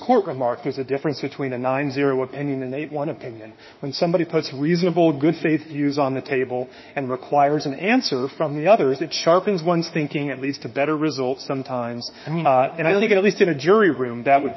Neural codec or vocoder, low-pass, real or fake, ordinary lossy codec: autoencoder, 48 kHz, 32 numbers a frame, DAC-VAE, trained on Japanese speech; 7.2 kHz; fake; MP3, 24 kbps